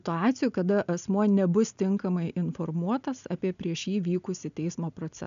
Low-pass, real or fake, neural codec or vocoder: 7.2 kHz; real; none